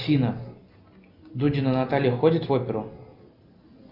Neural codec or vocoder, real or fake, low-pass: none; real; 5.4 kHz